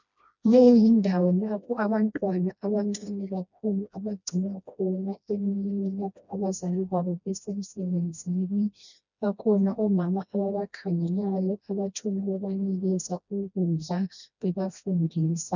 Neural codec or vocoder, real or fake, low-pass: codec, 16 kHz, 1 kbps, FreqCodec, smaller model; fake; 7.2 kHz